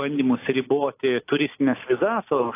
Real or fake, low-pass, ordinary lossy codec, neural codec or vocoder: real; 3.6 kHz; AAC, 24 kbps; none